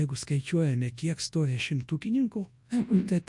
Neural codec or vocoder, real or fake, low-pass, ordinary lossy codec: codec, 24 kHz, 0.9 kbps, WavTokenizer, large speech release; fake; 10.8 kHz; MP3, 48 kbps